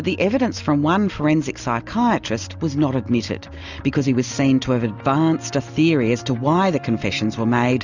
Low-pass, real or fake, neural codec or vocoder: 7.2 kHz; real; none